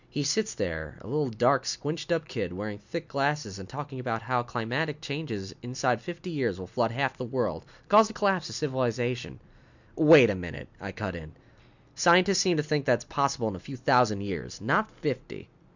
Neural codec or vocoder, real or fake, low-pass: none; real; 7.2 kHz